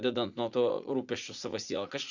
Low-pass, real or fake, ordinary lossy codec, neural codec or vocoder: 7.2 kHz; fake; Opus, 64 kbps; vocoder, 44.1 kHz, 80 mel bands, Vocos